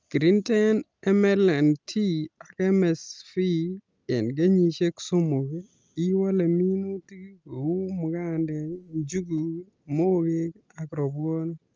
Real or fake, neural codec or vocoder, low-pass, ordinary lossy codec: real; none; 7.2 kHz; Opus, 24 kbps